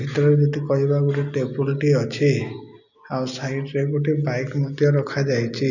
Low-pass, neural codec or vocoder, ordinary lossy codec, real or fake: 7.2 kHz; none; none; real